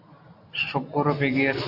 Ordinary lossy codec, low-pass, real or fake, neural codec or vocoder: AAC, 32 kbps; 5.4 kHz; real; none